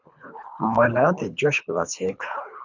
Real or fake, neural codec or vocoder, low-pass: fake; codec, 24 kHz, 3 kbps, HILCodec; 7.2 kHz